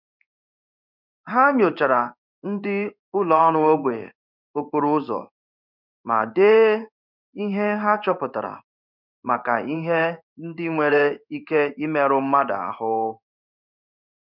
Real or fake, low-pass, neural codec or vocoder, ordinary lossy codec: fake; 5.4 kHz; codec, 16 kHz in and 24 kHz out, 1 kbps, XY-Tokenizer; none